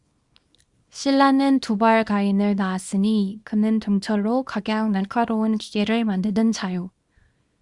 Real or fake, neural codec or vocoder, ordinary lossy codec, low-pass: fake; codec, 24 kHz, 0.9 kbps, WavTokenizer, small release; Opus, 64 kbps; 10.8 kHz